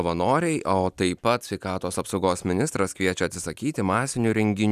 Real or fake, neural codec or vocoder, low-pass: real; none; 14.4 kHz